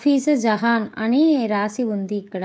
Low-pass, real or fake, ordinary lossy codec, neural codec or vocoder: none; fake; none; codec, 16 kHz, 16 kbps, FreqCodec, smaller model